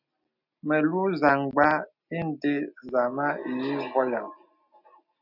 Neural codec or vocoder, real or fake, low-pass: none; real; 5.4 kHz